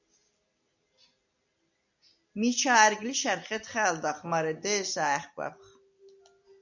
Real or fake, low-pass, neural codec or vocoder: real; 7.2 kHz; none